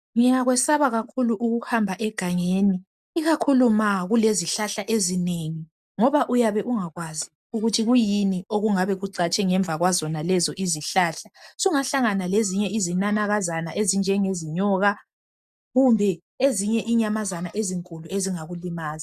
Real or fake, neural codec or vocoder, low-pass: real; none; 14.4 kHz